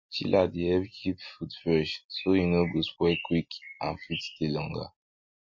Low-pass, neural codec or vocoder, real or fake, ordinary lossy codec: 7.2 kHz; none; real; MP3, 32 kbps